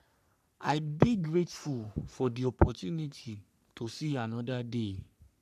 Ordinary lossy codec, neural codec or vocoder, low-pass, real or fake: none; codec, 44.1 kHz, 3.4 kbps, Pupu-Codec; 14.4 kHz; fake